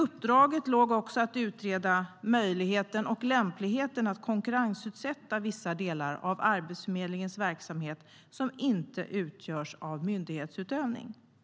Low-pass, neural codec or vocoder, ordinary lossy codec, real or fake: none; none; none; real